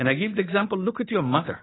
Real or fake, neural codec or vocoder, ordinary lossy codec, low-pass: fake; codec, 24 kHz, 3.1 kbps, DualCodec; AAC, 16 kbps; 7.2 kHz